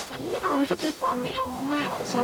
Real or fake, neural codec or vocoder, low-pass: fake; codec, 44.1 kHz, 0.9 kbps, DAC; 19.8 kHz